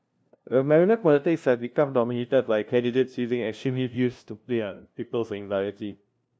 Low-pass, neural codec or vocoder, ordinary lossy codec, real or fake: none; codec, 16 kHz, 0.5 kbps, FunCodec, trained on LibriTTS, 25 frames a second; none; fake